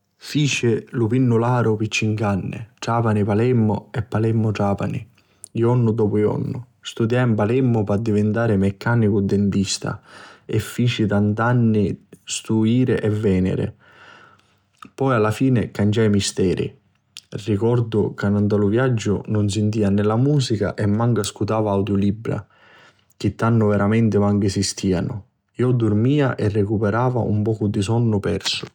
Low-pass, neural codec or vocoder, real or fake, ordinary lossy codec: 19.8 kHz; none; real; none